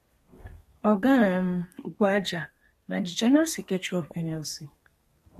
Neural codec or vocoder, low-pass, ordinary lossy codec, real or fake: codec, 32 kHz, 1.9 kbps, SNAC; 14.4 kHz; AAC, 48 kbps; fake